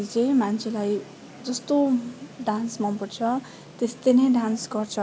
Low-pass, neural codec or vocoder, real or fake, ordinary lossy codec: none; none; real; none